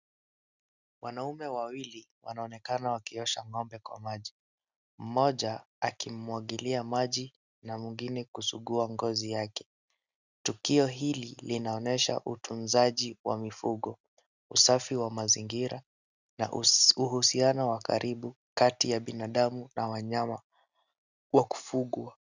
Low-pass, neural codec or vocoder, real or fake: 7.2 kHz; none; real